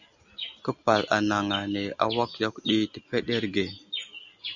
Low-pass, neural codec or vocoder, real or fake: 7.2 kHz; none; real